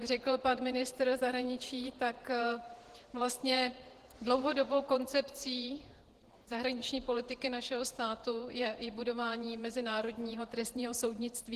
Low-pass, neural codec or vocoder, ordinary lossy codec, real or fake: 14.4 kHz; vocoder, 48 kHz, 128 mel bands, Vocos; Opus, 16 kbps; fake